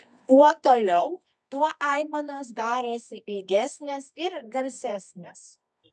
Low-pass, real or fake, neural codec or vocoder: 10.8 kHz; fake; codec, 24 kHz, 0.9 kbps, WavTokenizer, medium music audio release